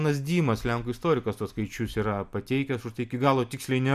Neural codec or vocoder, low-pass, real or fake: none; 14.4 kHz; real